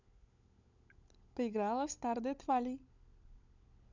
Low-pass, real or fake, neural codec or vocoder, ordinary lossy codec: 7.2 kHz; fake; codec, 16 kHz, 16 kbps, FunCodec, trained on LibriTTS, 50 frames a second; none